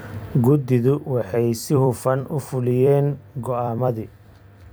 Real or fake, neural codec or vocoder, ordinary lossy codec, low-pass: fake; vocoder, 44.1 kHz, 128 mel bands every 256 samples, BigVGAN v2; none; none